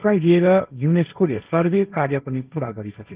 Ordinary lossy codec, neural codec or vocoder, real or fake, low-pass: Opus, 32 kbps; codec, 16 kHz, 1.1 kbps, Voila-Tokenizer; fake; 3.6 kHz